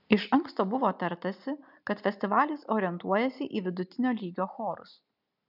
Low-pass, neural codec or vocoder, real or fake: 5.4 kHz; none; real